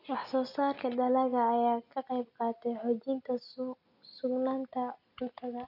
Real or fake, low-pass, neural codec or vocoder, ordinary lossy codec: real; 5.4 kHz; none; none